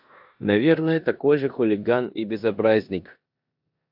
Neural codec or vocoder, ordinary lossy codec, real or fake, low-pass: codec, 16 kHz in and 24 kHz out, 0.9 kbps, LongCat-Audio-Codec, four codebook decoder; AAC, 48 kbps; fake; 5.4 kHz